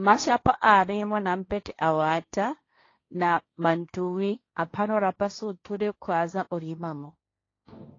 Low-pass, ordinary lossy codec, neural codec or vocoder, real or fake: 7.2 kHz; AAC, 32 kbps; codec, 16 kHz, 1.1 kbps, Voila-Tokenizer; fake